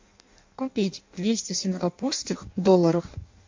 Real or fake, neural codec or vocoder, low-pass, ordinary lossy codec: fake; codec, 16 kHz in and 24 kHz out, 0.6 kbps, FireRedTTS-2 codec; 7.2 kHz; MP3, 48 kbps